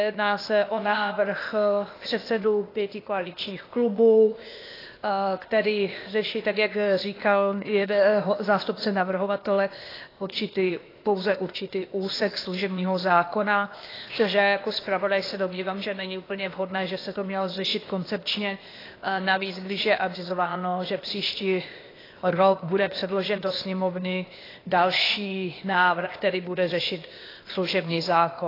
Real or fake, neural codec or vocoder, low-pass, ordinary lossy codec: fake; codec, 16 kHz, 0.8 kbps, ZipCodec; 5.4 kHz; AAC, 24 kbps